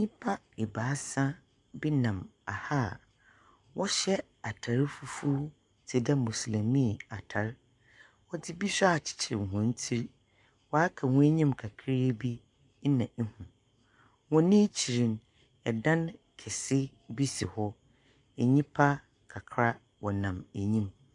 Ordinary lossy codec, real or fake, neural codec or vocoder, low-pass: MP3, 96 kbps; fake; codec, 44.1 kHz, 7.8 kbps, Pupu-Codec; 10.8 kHz